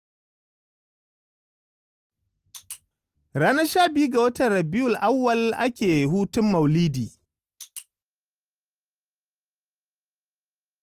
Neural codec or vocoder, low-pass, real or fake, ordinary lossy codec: none; 14.4 kHz; real; Opus, 24 kbps